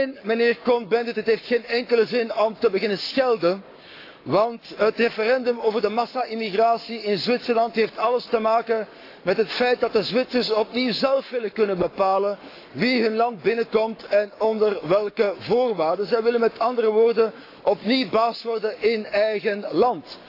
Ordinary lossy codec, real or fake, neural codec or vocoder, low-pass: AAC, 32 kbps; fake; codec, 44.1 kHz, 7.8 kbps, Pupu-Codec; 5.4 kHz